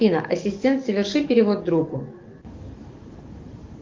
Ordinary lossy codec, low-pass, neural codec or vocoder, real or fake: Opus, 32 kbps; 7.2 kHz; codec, 44.1 kHz, 7.8 kbps, DAC; fake